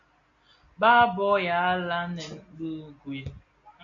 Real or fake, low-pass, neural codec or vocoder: real; 7.2 kHz; none